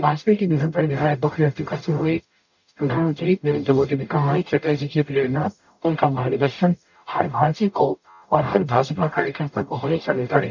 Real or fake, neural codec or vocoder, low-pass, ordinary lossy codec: fake; codec, 44.1 kHz, 0.9 kbps, DAC; 7.2 kHz; none